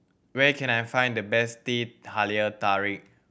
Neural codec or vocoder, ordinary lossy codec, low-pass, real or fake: none; none; none; real